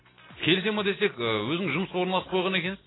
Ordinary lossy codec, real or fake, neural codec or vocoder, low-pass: AAC, 16 kbps; real; none; 7.2 kHz